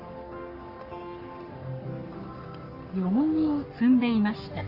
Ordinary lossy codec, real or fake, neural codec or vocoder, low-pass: Opus, 32 kbps; fake; codec, 44.1 kHz, 3.4 kbps, Pupu-Codec; 5.4 kHz